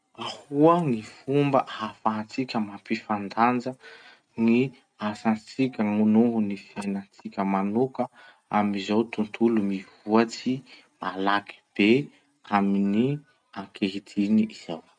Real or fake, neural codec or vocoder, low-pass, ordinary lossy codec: real; none; 9.9 kHz; none